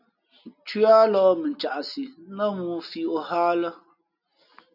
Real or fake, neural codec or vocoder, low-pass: real; none; 5.4 kHz